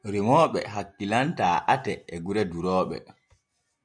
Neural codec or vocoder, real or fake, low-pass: none; real; 9.9 kHz